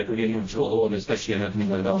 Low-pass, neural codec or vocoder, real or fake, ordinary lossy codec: 7.2 kHz; codec, 16 kHz, 0.5 kbps, FreqCodec, smaller model; fake; AAC, 32 kbps